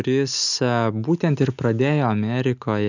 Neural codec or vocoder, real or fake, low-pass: none; real; 7.2 kHz